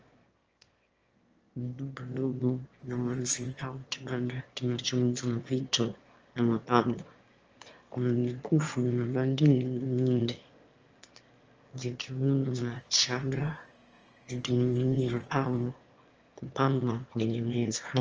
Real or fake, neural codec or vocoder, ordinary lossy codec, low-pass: fake; autoencoder, 22.05 kHz, a latent of 192 numbers a frame, VITS, trained on one speaker; Opus, 24 kbps; 7.2 kHz